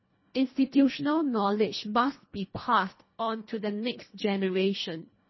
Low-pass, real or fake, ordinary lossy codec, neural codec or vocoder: 7.2 kHz; fake; MP3, 24 kbps; codec, 24 kHz, 1.5 kbps, HILCodec